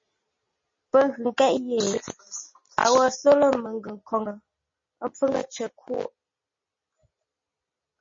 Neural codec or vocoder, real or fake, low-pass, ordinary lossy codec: none; real; 7.2 kHz; MP3, 32 kbps